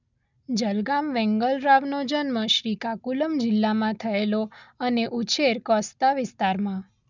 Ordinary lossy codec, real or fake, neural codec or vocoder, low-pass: none; real; none; 7.2 kHz